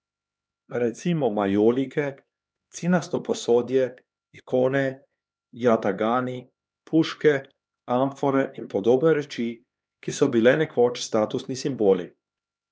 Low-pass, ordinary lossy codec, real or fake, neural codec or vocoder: none; none; fake; codec, 16 kHz, 2 kbps, X-Codec, HuBERT features, trained on LibriSpeech